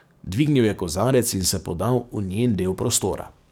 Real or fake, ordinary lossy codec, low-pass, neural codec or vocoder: fake; none; none; codec, 44.1 kHz, 7.8 kbps, DAC